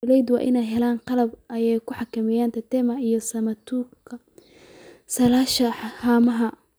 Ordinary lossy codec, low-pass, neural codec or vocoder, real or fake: none; none; none; real